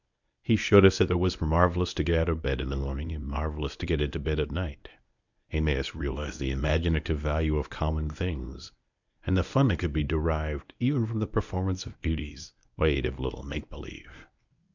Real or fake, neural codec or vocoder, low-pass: fake; codec, 24 kHz, 0.9 kbps, WavTokenizer, medium speech release version 1; 7.2 kHz